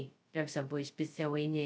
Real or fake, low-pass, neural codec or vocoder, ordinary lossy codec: fake; none; codec, 16 kHz, 0.2 kbps, FocalCodec; none